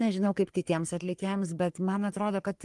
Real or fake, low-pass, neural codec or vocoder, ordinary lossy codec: fake; 10.8 kHz; codec, 44.1 kHz, 3.4 kbps, Pupu-Codec; Opus, 24 kbps